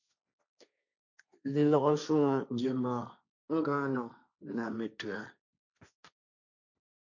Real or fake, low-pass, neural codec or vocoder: fake; 7.2 kHz; codec, 16 kHz, 1.1 kbps, Voila-Tokenizer